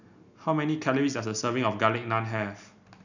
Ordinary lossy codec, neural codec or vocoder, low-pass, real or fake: none; none; 7.2 kHz; real